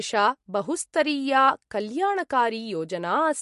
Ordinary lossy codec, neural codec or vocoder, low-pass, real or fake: MP3, 48 kbps; none; 14.4 kHz; real